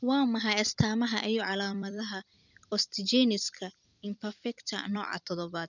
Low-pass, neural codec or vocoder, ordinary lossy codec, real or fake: 7.2 kHz; vocoder, 44.1 kHz, 128 mel bands every 256 samples, BigVGAN v2; none; fake